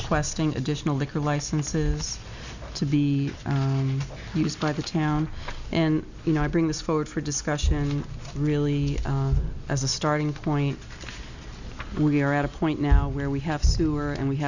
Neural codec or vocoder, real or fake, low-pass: none; real; 7.2 kHz